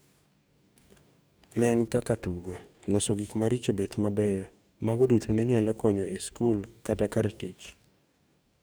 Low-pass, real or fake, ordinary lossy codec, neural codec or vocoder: none; fake; none; codec, 44.1 kHz, 2.6 kbps, DAC